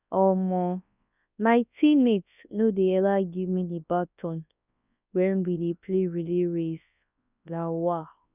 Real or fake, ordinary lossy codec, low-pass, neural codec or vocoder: fake; none; 3.6 kHz; codec, 24 kHz, 0.9 kbps, WavTokenizer, large speech release